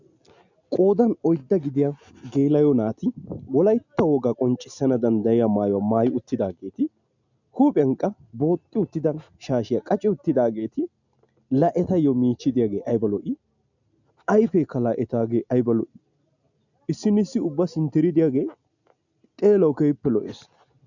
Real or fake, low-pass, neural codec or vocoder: real; 7.2 kHz; none